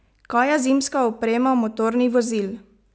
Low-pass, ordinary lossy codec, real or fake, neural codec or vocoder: none; none; real; none